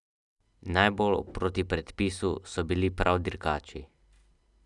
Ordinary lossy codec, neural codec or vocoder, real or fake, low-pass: none; none; real; 10.8 kHz